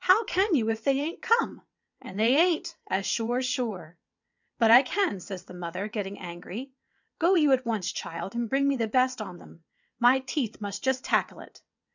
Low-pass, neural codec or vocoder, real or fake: 7.2 kHz; vocoder, 22.05 kHz, 80 mel bands, WaveNeXt; fake